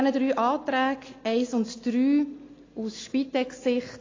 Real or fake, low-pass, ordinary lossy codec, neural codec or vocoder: real; 7.2 kHz; AAC, 32 kbps; none